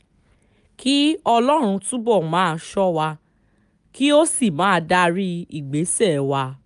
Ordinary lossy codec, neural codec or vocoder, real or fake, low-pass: none; none; real; 10.8 kHz